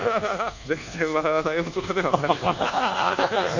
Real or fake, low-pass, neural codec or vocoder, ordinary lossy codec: fake; 7.2 kHz; codec, 24 kHz, 1.2 kbps, DualCodec; none